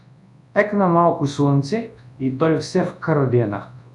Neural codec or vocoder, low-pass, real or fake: codec, 24 kHz, 0.9 kbps, WavTokenizer, large speech release; 10.8 kHz; fake